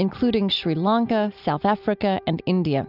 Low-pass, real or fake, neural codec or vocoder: 5.4 kHz; real; none